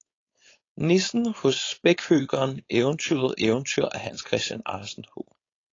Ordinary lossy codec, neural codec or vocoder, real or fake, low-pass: AAC, 32 kbps; codec, 16 kHz, 4.8 kbps, FACodec; fake; 7.2 kHz